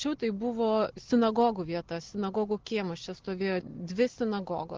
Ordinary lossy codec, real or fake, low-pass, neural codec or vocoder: Opus, 16 kbps; real; 7.2 kHz; none